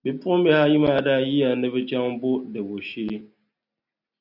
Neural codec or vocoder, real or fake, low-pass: none; real; 5.4 kHz